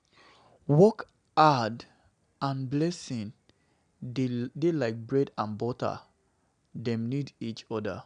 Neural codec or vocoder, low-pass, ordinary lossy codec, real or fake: none; 9.9 kHz; none; real